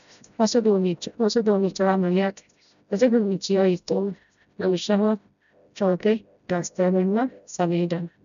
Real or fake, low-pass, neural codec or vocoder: fake; 7.2 kHz; codec, 16 kHz, 0.5 kbps, FreqCodec, smaller model